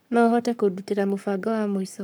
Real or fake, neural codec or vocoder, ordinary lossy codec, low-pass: fake; codec, 44.1 kHz, 7.8 kbps, Pupu-Codec; none; none